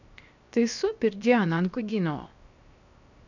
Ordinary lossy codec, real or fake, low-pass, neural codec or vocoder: none; fake; 7.2 kHz; codec, 16 kHz, 0.7 kbps, FocalCodec